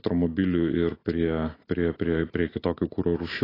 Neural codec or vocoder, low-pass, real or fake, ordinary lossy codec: none; 5.4 kHz; real; AAC, 24 kbps